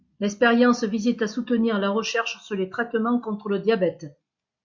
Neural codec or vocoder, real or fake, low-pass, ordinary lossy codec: none; real; 7.2 kHz; MP3, 48 kbps